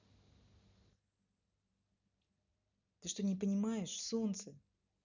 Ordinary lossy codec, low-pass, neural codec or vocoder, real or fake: none; 7.2 kHz; none; real